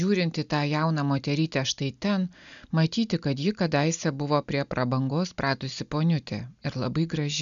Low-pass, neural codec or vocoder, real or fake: 7.2 kHz; none; real